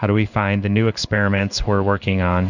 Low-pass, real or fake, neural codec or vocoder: 7.2 kHz; fake; codec, 16 kHz in and 24 kHz out, 1 kbps, XY-Tokenizer